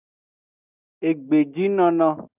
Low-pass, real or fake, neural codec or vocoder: 3.6 kHz; real; none